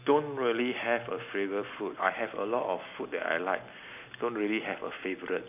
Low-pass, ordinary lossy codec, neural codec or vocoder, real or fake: 3.6 kHz; none; none; real